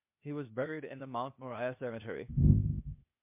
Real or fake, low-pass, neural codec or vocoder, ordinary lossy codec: fake; 3.6 kHz; codec, 16 kHz, 0.8 kbps, ZipCodec; MP3, 32 kbps